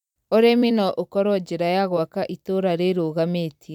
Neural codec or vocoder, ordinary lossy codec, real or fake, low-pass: vocoder, 44.1 kHz, 128 mel bands every 256 samples, BigVGAN v2; none; fake; 19.8 kHz